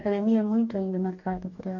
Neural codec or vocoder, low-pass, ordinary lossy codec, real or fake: codec, 44.1 kHz, 2.6 kbps, DAC; 7.2 kHz; none; fake